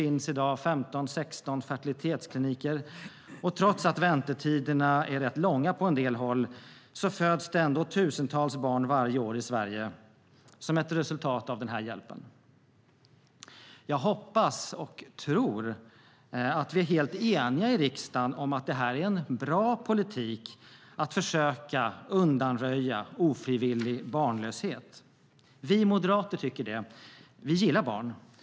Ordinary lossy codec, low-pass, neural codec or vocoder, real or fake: none; none; none; real